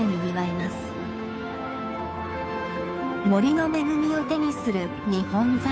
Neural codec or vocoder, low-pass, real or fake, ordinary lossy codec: codec, 16 kHz, 2 kbps, FunCodec, trained on Chinese and English, 25 frames a second; none; fake; none